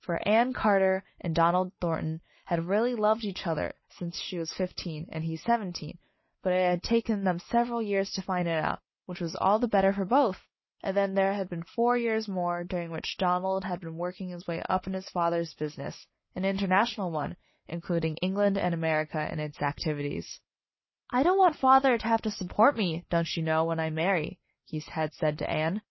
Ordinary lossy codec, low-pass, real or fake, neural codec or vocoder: MP3, 24 kbps; 7.2 kHz; real; none